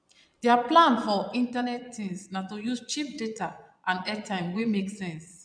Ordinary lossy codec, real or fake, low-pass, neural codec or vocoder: none; fake; 9.9 kHz; vocoder, 22.05 kHz, 80 mel bands, Vocos